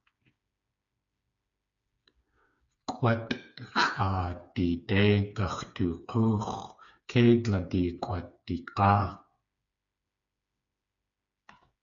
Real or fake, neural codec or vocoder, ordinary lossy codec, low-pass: fake; codec, 16 kHz, 4 kbps, FreqCodec, smaller model; MP3, 64 kbps; 7.2 kHz